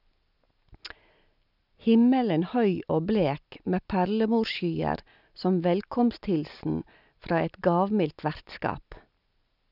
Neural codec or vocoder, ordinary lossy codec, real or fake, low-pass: none; none; real; 5.4 kHz